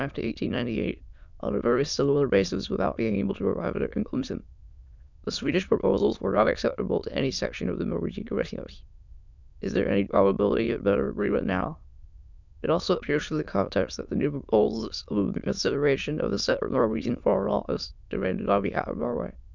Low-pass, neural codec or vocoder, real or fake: 7.2 kHz; autoencoder, 22.05 kHz, a latent of 192 numbers a frame, VITS, trained on many speakers; fake